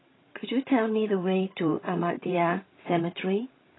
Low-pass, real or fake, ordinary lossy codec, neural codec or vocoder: 7.2 kHz; fake; AAC, 16 kbps; codec, 16 kHz, 16 kbps, FreqCodec, larger model